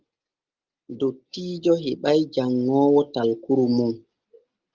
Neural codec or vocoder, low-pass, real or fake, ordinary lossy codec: none; 7.2 kHz; real; Opus, 24 kbps